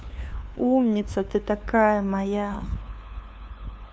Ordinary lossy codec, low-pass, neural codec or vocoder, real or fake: none; none; codec, 16 kHz, 2 kbps, FunCodec, trained on LibriTTS, 25 frames a second; fake